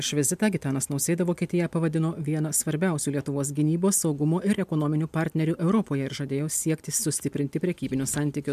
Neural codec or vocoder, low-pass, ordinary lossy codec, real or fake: none; 14.4 kHz; MP3, 96 kbps; real